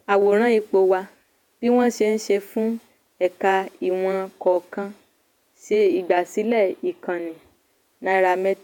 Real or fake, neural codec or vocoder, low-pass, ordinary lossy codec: fake; vocoder, 48 kHz, 128 mel bands, Vocos; 19.8 kHz; none